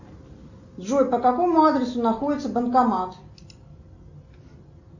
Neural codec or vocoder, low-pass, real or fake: none; 7.2 kHz; real